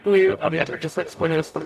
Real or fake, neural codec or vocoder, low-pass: fake; codec, 44.1 kHz, 0.9 kbps, DAC; 14.4 kHz